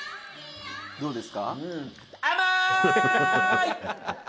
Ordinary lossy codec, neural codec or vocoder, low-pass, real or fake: none; none; none; real